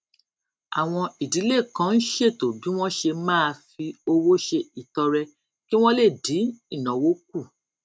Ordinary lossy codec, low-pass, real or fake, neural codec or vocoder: none; none; real; none